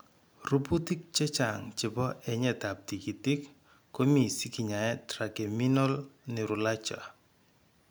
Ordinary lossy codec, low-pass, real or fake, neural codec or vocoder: none; none; real; none